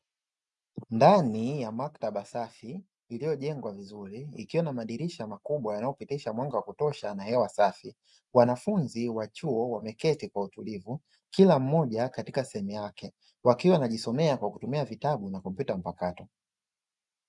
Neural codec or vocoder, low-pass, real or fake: none; 10.8 kHz; real